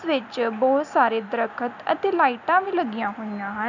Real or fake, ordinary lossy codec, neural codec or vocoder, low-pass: real; none; none; 7.2 kHz